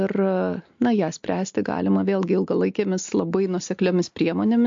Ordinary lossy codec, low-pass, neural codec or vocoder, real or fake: MP3, 48 kbps; 7.2 kHz; none; real